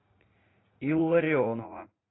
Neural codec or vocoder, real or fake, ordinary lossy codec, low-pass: vocoder, 44.1 kHz, 80 mel bands, Vocos; fake; AAC, 16 kbps; 7.2 kHz